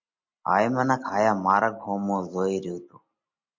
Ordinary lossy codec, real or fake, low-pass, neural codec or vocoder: MP3, 48 kbps; real; 7.2 kHz; none